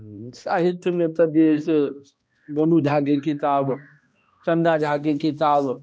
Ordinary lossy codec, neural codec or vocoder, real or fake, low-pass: none; codec, 16 kHz, 1 kbps, X-Codec, HuBERT features, trained on balanced general audio; fake; none